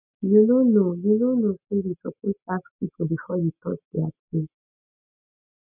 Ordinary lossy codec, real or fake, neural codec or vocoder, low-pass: none; real; none; 3.6 kHz